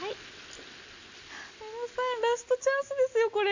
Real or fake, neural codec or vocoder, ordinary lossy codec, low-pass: real; none; AAC, 48 kbps; 7.2 kHz